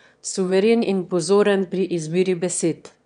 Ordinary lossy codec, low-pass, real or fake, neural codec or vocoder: none; 9.9 kHz; fake; autoencoder, 22.05 kHz, a latent of 192 numbers a frame, VITS, trained on one speaker